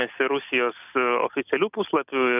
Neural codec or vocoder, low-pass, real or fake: none; 3.6 kHz; real